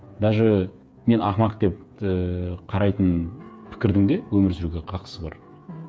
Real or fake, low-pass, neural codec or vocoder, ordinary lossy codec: fake; none; codec, 16 kHz, 8 kbps, FreqCodec, smaller model; none